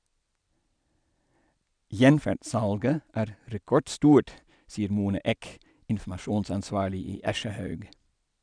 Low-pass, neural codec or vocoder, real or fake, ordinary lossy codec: 9.9 kHz; none; real; none